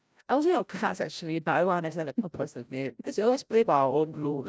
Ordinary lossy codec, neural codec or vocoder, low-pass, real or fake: none; codec, 16 kHz, 0.5 kbps, FreqCodec, larger model; none; fake